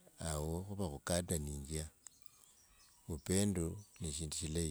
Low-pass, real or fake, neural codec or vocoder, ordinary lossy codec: none; real; none; none